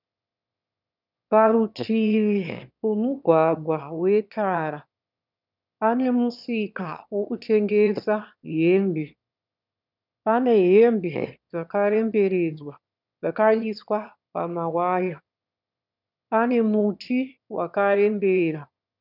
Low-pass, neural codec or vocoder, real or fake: 5.4 kHz; autoencoder, 22.05 kHz, a latent of 192 numbers a frame, VITS, trained on one speaker; fake